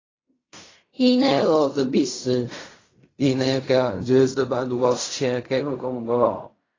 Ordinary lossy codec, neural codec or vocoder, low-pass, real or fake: AAC, 32 kbps; codec, 16 kHz in and 24 kHz out, 0.4 kbps, LongCat-Audio-Codec, fine tuned four codebook decoder; 7.2 kHz; fake